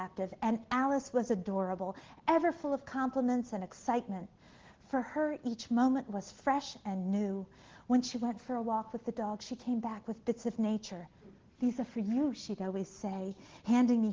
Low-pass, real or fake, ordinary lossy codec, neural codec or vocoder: 7.2 kHz; real; Opus, 16 kbps; none